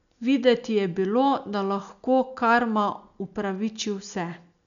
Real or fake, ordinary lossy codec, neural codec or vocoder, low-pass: real; none; none; 7.2 kHz